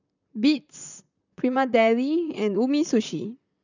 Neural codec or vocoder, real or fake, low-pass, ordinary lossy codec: vocoder, 44.1 kHz, 128 mel bands, Pupu-Vocoder; fake; 7.2 kHz; none